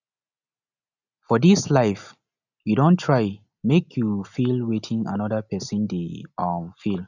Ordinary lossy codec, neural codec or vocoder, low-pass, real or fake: none; none; 7.2 kHz; real